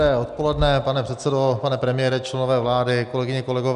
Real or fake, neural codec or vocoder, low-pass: real; none; 10.8 kHz